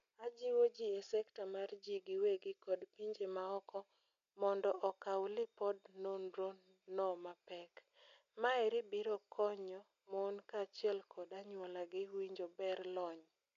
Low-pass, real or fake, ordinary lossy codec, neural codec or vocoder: 7.2 kHz; real; MP3, 96 kbps; none